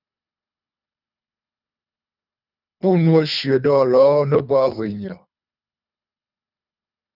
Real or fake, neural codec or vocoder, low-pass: fake; codec, 24 kHz, 3 kbps, HILCodec; 5.4 kHz